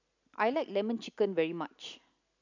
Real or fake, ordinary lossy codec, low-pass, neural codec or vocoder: real; none; 7.2 kHz; none